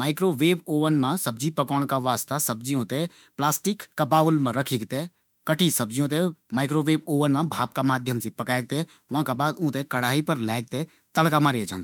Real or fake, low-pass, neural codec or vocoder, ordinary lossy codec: fake; none; autoencoder, 48 kHz, 32 numbers a frame, DAC-VAE, trained on Japanese speech; none